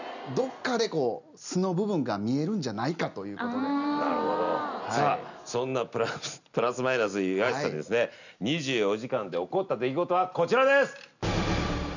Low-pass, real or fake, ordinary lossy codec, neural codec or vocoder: 7.2 kHz; real; none; none